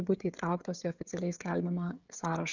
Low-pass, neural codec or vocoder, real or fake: 7.2 kHz; codec, 16 kHz, 16 kbps, FreqCodec, smaller model; fake